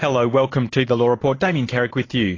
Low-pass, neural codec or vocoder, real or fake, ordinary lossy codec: 7.2 kHz; vocoder, 44.1 kHz, 128 mel bands every 512 samples, BigVGAN v2; fake; AAC, 32 kbps